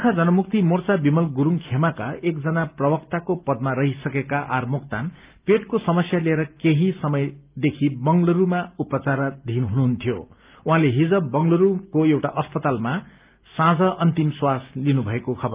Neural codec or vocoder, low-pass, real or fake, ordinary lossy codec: none; 3.6 kHz; real; Opus, 24 kbps